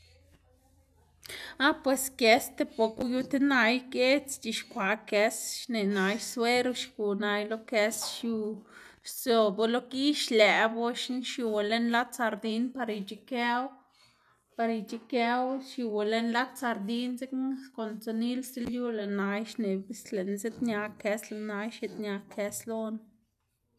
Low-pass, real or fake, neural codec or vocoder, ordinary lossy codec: 14.4 kHz; real; none; none